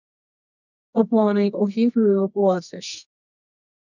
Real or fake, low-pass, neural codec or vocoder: fake; 7.2 kHz; codec, 24 kHz, 0.9 kbps, WavTokenizer, medium music audio release